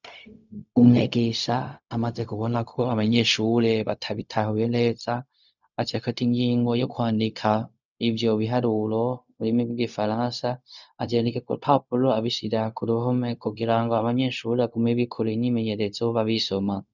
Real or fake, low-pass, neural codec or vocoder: fake; 7.2 kHz; codec, 16 kHz, 0.4 kbps, LongCat-Audio-Codec